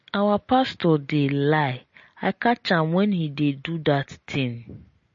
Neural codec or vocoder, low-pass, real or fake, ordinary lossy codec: none; 7.2 kHz; real; MP3, 32 kbps